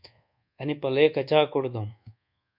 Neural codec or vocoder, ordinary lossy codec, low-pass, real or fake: codec, 24 kHz, 1.2 kbps, DualCodec; MP3, 48 kbps; 5.4 kHz; fake